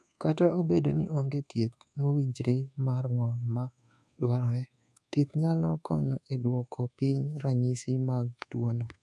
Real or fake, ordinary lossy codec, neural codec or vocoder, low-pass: fake; none; codec, 24 kHz, 1.2 kbps, DualCodec; none